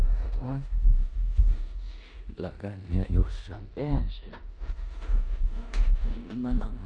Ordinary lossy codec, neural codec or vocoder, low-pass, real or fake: none; codec, 16 kHz in and 24 kHz out, 0.9 kbps, LongCat-Audio-Codec, four codebook decoder; 9.9 kHz; fake